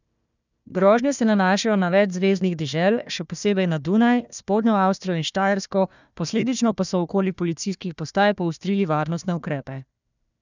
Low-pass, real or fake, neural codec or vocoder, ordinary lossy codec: 7.2 kHz; fake; codec, 16 kHz, 1 kbps, FunCodec, trained on Chinese and English, 50 frames a second; none